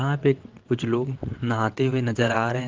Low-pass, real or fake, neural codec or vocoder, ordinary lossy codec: 7.2 kHz; fake; vocoder, 22.05 kHz, 80 mel bands, WaveNeXt; Opus, 16 kbps